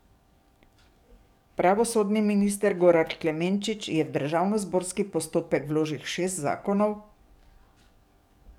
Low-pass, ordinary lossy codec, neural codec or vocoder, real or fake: 19.8 kHz; none; codec, 44.1 kHz, 7.8 kbps, DAC; fake